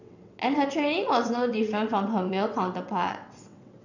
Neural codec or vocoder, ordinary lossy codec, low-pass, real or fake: vocoder, 22.05 kHz, 80 mel bands, WaveNeXt; none; 7.2 kHz; fake